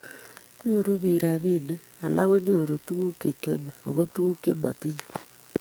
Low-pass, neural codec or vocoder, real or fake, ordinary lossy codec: none; codec, 44.1 kHz, 2.6 kbps, SNAC; fake; none